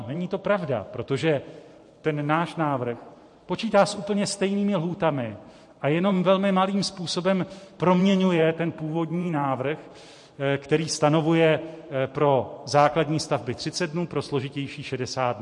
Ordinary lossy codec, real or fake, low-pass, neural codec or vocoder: MP3, 48 kbps; fake; 10.8 kHz; vocoder, 44.1 kHz, 128 mel bands every 512 samples, BigVGAN v2